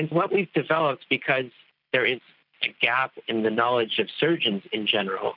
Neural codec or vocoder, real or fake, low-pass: none; real; 5.4 kHz